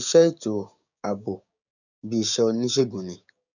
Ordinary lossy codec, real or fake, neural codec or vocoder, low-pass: none; fake; codec, 16 kHz, 6 kbps, DAC; 7.2 kHz